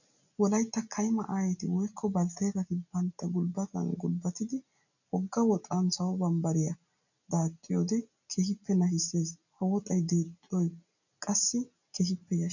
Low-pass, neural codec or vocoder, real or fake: 7.2 kHz; none; real